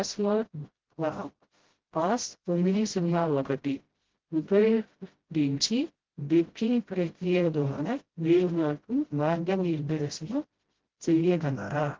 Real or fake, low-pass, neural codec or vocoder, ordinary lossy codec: fake; 7.2 kHz; codec, 16 kHz, 0.5 kbps, FreqCodec, smaller model; Opus, 16 kbps